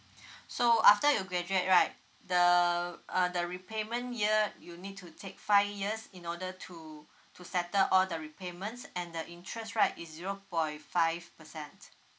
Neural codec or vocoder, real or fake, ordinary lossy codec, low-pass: none; real; none; none